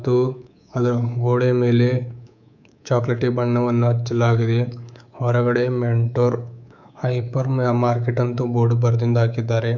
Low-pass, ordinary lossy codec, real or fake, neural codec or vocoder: 7.2 kHz; none; fake; codec, 24 kHz, 3.1 kbps, DualCodec